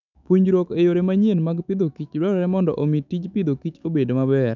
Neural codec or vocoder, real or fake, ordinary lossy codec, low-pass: autoencoder, 48 kHz, 128 numbers a frame, DAC-VAE, trained on Japanese speech; fake; none; 7.2 kHz